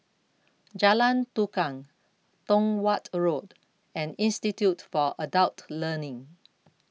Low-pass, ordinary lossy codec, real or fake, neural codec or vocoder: none; none; real; none